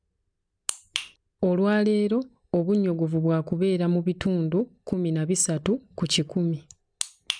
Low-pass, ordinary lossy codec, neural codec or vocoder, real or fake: 9.9 kHz; none; none; real